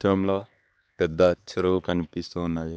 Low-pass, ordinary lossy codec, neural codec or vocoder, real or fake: none; none; codec, 16 kHz, 2 kbps, X-Codec, HuBERT features, trained on LibriSpeech; fake